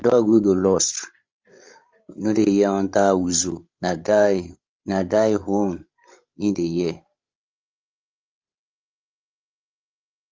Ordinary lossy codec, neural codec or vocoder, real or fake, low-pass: Opus, 32 kbps; codec, 16 kHz, 16 kbps, FreqCodec, larger model; fake; 7.2 kHz